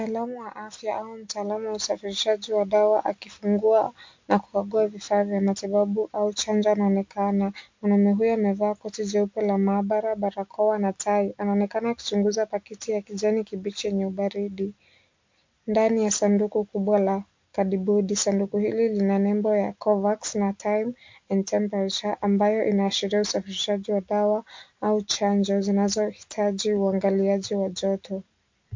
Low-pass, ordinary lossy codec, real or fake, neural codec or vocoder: 7.2 kHz; MP3, 48 kbps; real; none